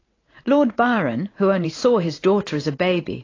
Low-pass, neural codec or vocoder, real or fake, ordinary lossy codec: 7.2 kHz; vocoder, 44.1 kHz, 128 mel bands every 512 samples, BigVGAN v2; fake; AAC, 32 kbps